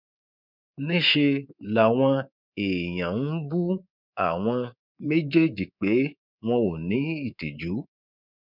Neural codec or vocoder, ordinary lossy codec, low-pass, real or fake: codec, 24 kHz, 3.1 kbps, DualCodec; none; 5.4 kHz; fake